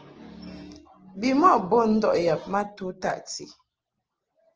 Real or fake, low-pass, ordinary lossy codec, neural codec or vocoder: real; 7.2 kHz; Opus, 16 kbps; none